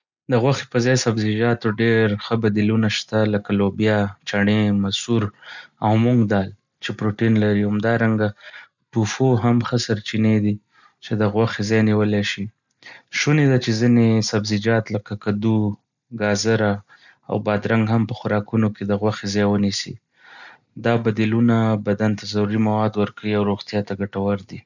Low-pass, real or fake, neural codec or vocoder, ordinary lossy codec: 7.2 kHz; real; none; none